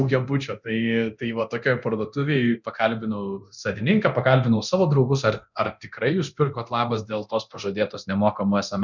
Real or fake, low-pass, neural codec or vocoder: fake; 7.2 kHz; codec, 24 kHz, 0.9 kbps, DualCodec